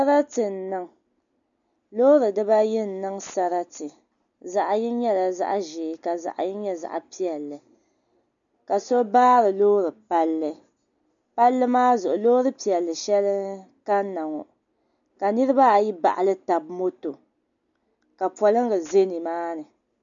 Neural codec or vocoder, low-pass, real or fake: none; 7.2 kHz; real